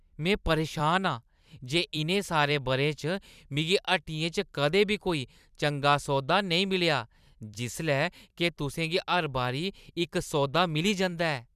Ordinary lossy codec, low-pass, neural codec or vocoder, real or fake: none; 14.4 kHz; vocoder, 44.1 kHz, 128 mel bands every 256 samples, BigVGAN v2; fake